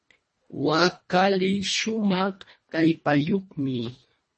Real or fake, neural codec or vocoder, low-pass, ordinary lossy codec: fake; codec, 24 kHz, 1.5 kbps, HILCodec; 10.8 kHz; MP3, 32 kbps